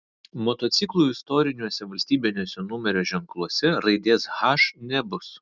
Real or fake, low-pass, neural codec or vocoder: real; 7.2 kHz; none